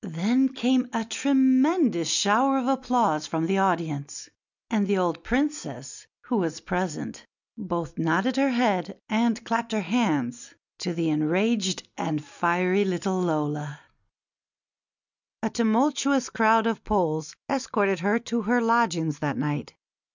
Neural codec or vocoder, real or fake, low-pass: none; real; 7.2 kHz